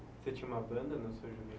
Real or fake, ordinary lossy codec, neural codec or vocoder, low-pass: real; none; none; none